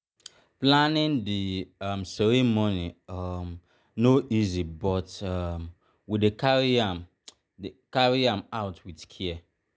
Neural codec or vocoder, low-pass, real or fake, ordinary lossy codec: none; none; real; none